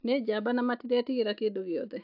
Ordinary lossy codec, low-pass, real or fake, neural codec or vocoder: AAC, 48 kbps; 5.4 kHz; real; none